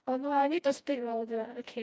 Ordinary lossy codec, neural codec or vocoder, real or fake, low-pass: none; codec, 16 kHz, 1 kbps, FreqCodec, smaller model; fake; none